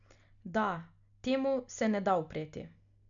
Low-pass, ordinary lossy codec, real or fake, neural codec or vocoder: 7.2 kHz; none; real; none